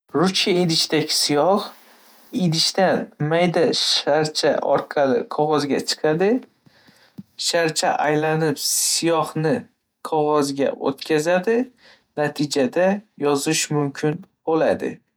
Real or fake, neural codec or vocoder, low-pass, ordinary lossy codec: fake; vocoder, 48 kHz, 128 mel bands, Vocos; none; none